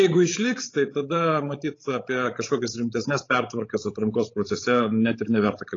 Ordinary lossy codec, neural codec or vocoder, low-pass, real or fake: AAC, 32 kbps; codec, 16 kHz, 16 kbps, FreqCodec, larger model; 7.2 kHz; fake